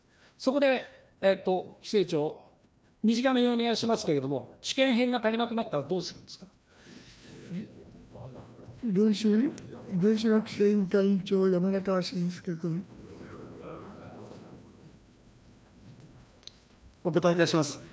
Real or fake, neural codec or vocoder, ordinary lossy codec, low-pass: fake; codec, 16 kHz, 1 kbps, FreqCodec, larger model; none; none